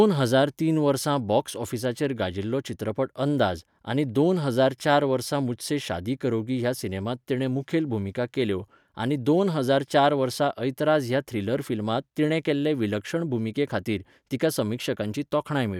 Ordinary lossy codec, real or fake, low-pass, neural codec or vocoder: none; real; 19.8 kHz; none